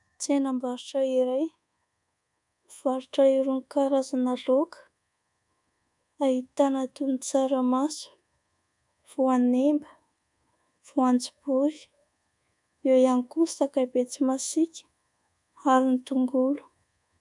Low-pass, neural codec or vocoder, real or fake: 10.8 kHz; codec, 24 kHz, 1.2 kbps, DualCodec; fake